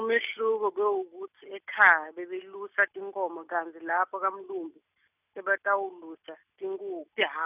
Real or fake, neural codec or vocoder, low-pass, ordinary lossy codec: real; none; 3.6 kHz; none